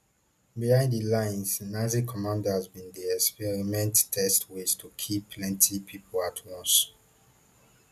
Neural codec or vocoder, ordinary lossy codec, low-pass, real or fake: none; none; 14.4 kHz; real